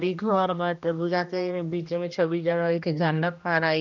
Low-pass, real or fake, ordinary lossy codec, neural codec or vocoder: 7.2 kHz; fake; none; codec, 16 kHz, 1 kbps, X-Codec, HuBERT features, trained on general audio